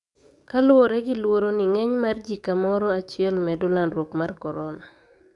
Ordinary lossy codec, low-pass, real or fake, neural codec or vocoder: none; 10.8 kHz; fake; codec, 44.1 kHz, 7.8 kbps, DAC